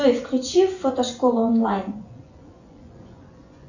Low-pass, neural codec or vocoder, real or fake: 7.2 kHz; vocoder, 24 kHz, 100 mel bands, Vocos; fake